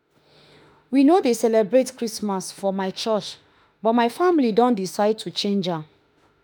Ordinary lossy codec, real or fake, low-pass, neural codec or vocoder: none; fake; none; autoencoder, 48 kHz, 32 numbers a frame, DAC-VAE, trained on Japanese speech